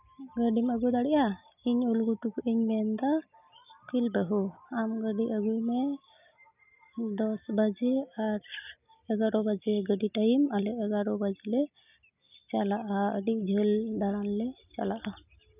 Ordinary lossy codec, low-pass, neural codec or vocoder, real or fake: none; 3.6 kHz; none; real